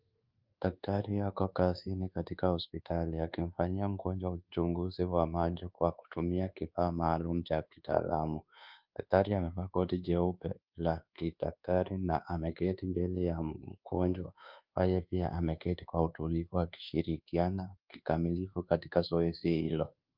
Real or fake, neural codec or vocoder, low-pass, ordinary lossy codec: fake; codec, 24 kHz, 1.2 kbps, DualCodec; 5.4 kHz; Opus, 32 kbps